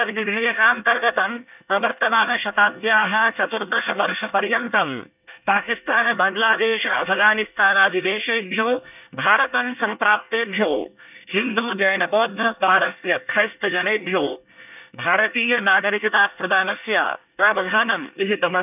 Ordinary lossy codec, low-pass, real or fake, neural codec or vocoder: none; 3.6 kHz; fake; codec, 24 kHz, 1 kbps, SNAC